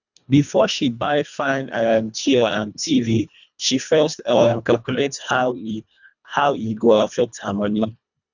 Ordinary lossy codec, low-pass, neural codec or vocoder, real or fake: none; 7.2 kHz; codec, 24 kHz, 1.5 kbps, HILCodec; fake